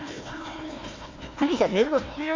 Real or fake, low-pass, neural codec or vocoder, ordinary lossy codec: fake; 7.2 kHz; codec, 16 kHz, 1 kbps, FunCodec, trained on Chinese and English, 50 frames a second; MP3, 48 kbps